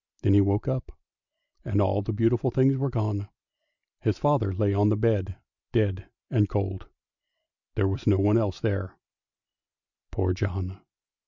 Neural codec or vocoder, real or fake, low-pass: none; real; 7.2 kHz